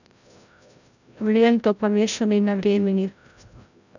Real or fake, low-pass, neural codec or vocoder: fake; 7.2 kHz; codec, 16 kHz, 0.5 kbps, FreqCodec, larger model